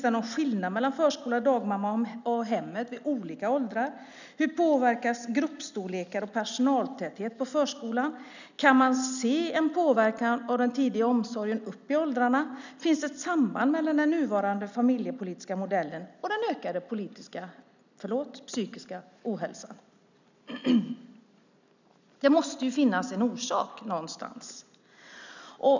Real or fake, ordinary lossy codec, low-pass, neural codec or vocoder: real; none; 7.2 kHz; none